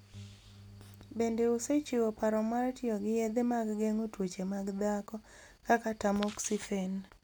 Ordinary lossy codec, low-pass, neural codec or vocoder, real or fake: none; none; none; real